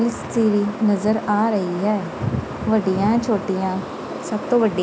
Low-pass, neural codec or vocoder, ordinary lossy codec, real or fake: none; none; none; real